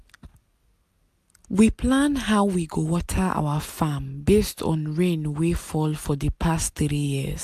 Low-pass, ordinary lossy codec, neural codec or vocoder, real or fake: 14.4 kHz; AAC, 96 kbps; none; real